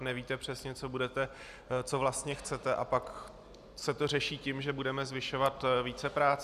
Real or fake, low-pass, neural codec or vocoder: real; 14.4 kHz; none